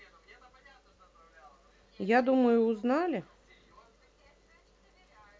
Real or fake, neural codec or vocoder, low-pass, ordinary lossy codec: real; none; none; none